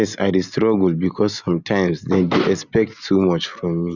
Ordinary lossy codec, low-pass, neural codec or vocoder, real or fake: none; 7.2 kHz; none; real